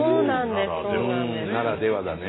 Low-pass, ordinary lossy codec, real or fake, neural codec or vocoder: 7.2 kHz; AAC, 16 kbps; real; none